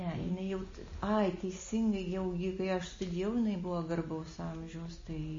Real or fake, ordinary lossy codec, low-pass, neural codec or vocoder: real; MP3, 32 kbps; 7.2 kHz; none